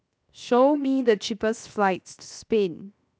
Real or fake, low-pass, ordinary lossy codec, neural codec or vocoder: fake; none; none; codec, 16 kHz, 0.7 kbps, FocalCodec